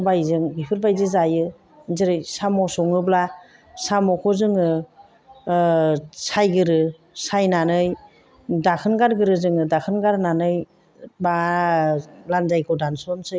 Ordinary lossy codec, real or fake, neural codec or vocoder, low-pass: none; real; none; none